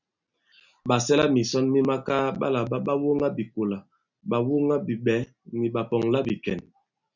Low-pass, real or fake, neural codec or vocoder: 7.2 kHz; real; none